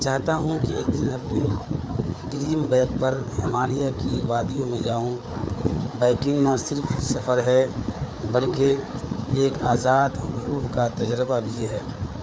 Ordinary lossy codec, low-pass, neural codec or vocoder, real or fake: none; none; codec, 16 kHz, 4 kbps, FreqCodec, larger model; fake